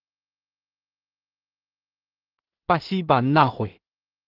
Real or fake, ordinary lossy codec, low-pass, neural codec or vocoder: fake; Opus, 16 kbps; 5.4 kHz; codec, 16 kHz in and 24 kHz out, 0.4 kbps, LongCat-Audio-Codec, two codebook decoder